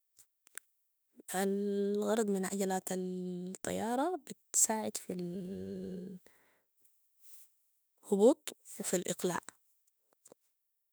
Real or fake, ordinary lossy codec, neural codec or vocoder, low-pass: fake; none; autoencoder, 48 kHz, 32 numbers a frame, DAC-VAE, trained on Japanese speech; none